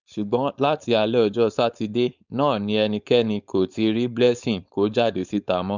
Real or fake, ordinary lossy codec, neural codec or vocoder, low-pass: fake; none; codec, 16 kHz, 4.8 kbps, FACodec; 7.2 kHz